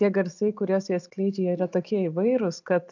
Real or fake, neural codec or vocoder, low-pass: real; none; 7.2 kHz